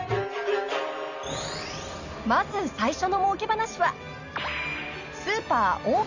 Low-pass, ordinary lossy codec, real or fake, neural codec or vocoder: 7.2 kHz; Opus, 64 kbps; real; none